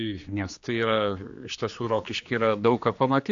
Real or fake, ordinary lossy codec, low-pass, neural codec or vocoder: fake; AAC, 64 kbps; 7.2 kHz; codec, 16 kHz, 2 kbps, X-Codec, HuBERT features, trained on general audio